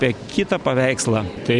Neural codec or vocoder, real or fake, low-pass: none; real; 10.8 kHz